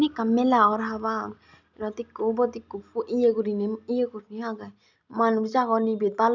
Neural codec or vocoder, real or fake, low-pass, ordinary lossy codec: none; real; 7.2 kHz; none